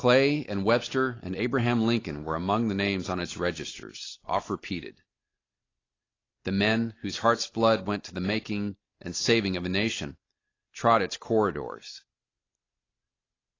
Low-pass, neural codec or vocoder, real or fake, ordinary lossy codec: 7.2 kHz; none; real; AAC, 32 kbps